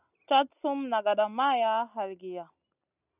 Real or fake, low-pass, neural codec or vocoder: real; 3.6 kHz; none